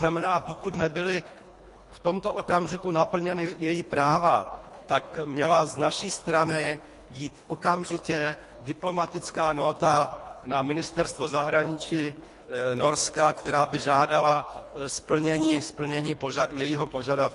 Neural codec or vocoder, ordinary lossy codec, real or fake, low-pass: codec, 24 kHz, 1.5 kbps, HILCodec; AAC, 48 kbps; fake; 10.8 kHz